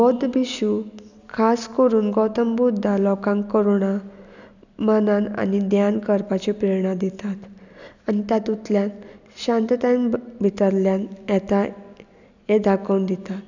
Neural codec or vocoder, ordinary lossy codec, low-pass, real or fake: none; none; 7.2 kHz; real